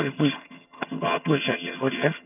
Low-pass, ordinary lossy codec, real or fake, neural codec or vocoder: 3.6 kHz; none; fake; vocoder, 22.05 kHz, 80 mel bands, HiFi-GAN